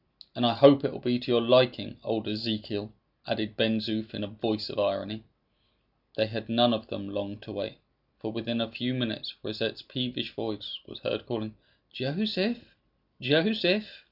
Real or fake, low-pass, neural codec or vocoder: real; 5.4 kHz; none